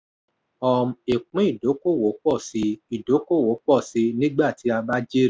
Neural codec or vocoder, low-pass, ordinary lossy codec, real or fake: none; none; none; real